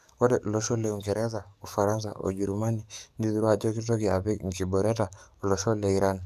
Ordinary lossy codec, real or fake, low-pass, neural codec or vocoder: none; fake; 14.4 kHz; codec, 44.1 kHz, 7.8 kbps, DAC